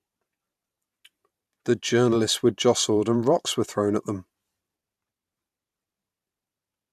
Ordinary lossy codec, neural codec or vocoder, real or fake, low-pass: AAC, 96 kbps; vocoder, 44.1 kHz, 128 mel bands every 512 samples, BigVGAN v2; fake; 14.4 kHz